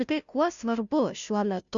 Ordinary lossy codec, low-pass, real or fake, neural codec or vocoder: MP3, 96 kbps; 7.2 kHz; fake; codec, 16 kHz, 0.5 kbps, FunCodec, trained on Chinese and English, 25 frames a second